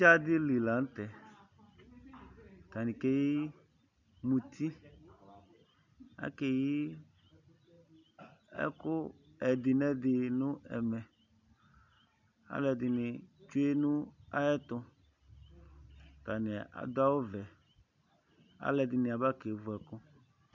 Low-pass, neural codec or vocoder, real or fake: 7.2 kHz; none; real